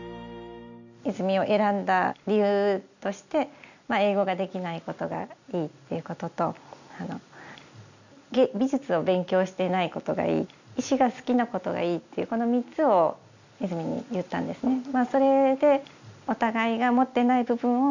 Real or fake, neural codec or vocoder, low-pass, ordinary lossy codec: real; none; 7.2 kHz; none